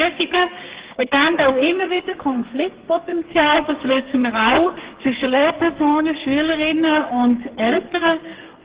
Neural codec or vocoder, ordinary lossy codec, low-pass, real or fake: codec, 32 kHz, 1.9 kbps, SNAC; Opus, 16 kbps; 3.6 kHz; fake